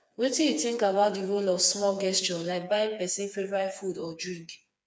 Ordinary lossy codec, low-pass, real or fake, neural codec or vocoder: none; none; fake; codec, 16 kHz, 4 kbps, FreqCodec, smaller model